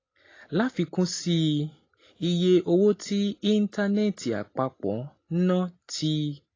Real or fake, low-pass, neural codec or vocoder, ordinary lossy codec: real; 7.2 kHz; none; AAC, 32 kbps